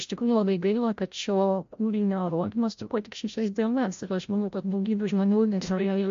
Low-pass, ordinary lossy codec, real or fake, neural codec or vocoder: 7.2 kHz; MP3, 48 kbps; fake; codec, 16 kHz, 0.5 kbps, FreqCodec, larger model